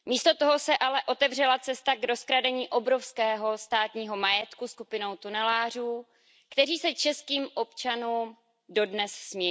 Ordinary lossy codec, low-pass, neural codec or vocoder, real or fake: none; none; none; real